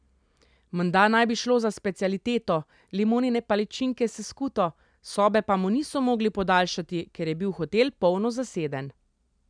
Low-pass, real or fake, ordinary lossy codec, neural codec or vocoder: 9.9 kHz; real; none; none